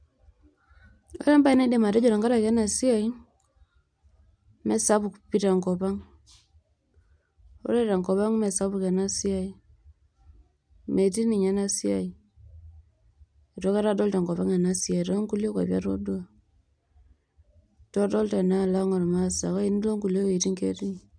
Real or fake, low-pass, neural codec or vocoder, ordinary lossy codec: real; 9.9 kHz; none; none